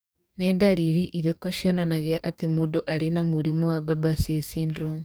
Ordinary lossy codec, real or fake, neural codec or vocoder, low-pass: none; fake; codec, 44.1 kHz, 2.6 kbps, DAC; none